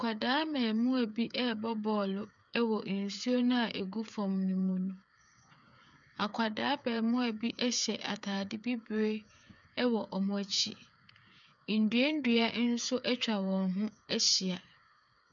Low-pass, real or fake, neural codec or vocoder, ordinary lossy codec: 7.2 kHz; fake; codec, 16 kHz, 8 kbps, FreqCodec, smaller model; MP3, 96 kbps